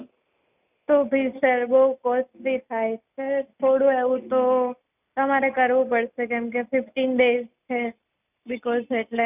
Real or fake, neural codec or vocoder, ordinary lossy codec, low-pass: real; none; none; 3.6 kHz